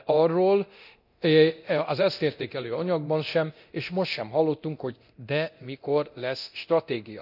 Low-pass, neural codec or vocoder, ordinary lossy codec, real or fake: 5.4 kHz; codec, 24 kHz, 0.9 kbps, DualCodec; none; fake